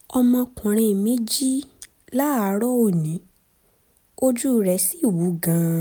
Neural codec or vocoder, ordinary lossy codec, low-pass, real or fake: none; none; none; real